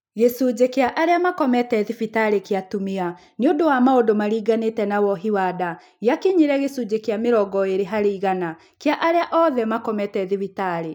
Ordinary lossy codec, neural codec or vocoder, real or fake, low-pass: none; none; real; 19.8 kHz